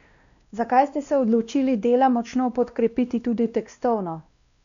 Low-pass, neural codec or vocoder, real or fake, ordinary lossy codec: 7.2 kHz; codec, 16 kHz, 1 kbps, X-Codec, WavLM features, trained on Multilingual LibriSpeech; fake; none